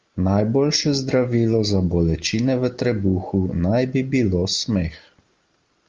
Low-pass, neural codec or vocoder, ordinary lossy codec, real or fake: 7.2 kHz; none; Opus, 32 kbps; real